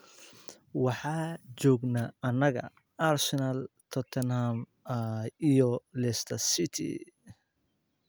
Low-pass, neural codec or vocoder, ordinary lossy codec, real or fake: none; none; none; real